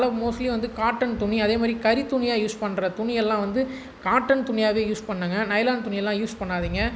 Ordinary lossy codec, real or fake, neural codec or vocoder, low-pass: none; real; none; none